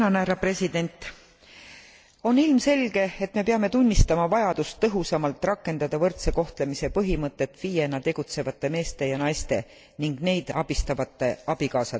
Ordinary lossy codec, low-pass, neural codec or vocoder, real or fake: none; none; none; real